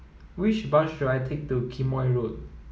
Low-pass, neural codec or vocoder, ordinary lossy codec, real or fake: none; none; none; real